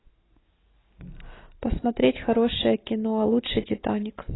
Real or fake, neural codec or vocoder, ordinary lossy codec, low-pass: real; none; AAC, 16 kbps; 7.2 kHz